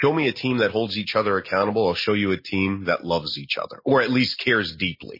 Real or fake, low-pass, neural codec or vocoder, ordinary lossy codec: real; 5.4 kHz; none; MP3, 24 kbps